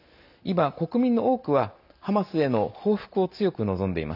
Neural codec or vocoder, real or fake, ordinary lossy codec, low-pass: none; real; none; 5.4 kHz